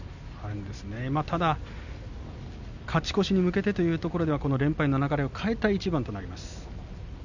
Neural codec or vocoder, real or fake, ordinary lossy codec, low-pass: none; real; none; 7.2 kHz